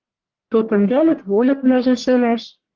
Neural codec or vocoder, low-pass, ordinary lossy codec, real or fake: codec, 44.1 kHz, 1.7 kbps, Pupu-Codec; 7.2 kHz; Opus, 16 kbps; fake